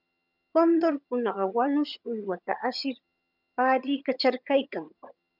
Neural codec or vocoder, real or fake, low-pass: vocoder, 22.05 kHz, 80 mel bands, HiFi-GAN; fake; 5.4 kHz